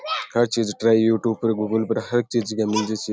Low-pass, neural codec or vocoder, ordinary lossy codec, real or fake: none; none; none; real